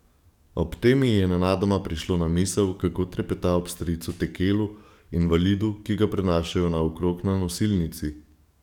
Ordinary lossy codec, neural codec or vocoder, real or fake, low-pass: none; codec, 44.1 kHz, 7.8 kbps, DAC; fake; 19.8 kHz